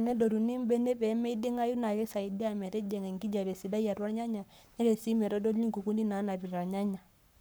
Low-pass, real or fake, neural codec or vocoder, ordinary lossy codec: none; fake; codec, 44.1 kHz, 7.8 kbps, Pupu-Codec; none